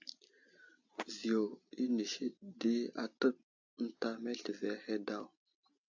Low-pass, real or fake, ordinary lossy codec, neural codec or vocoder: 7.2 kHz; real; AAC, 48 kbps; none